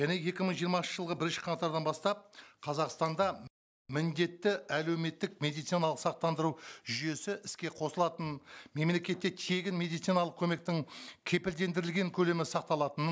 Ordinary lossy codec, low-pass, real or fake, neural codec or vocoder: none; none; real; none